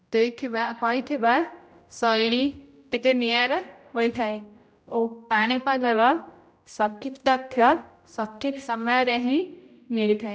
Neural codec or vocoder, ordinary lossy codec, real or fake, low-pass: codec, 16 kHz, 0.5 kbps, X-Codec, HuBERT features, trained on general audio; none; fake; none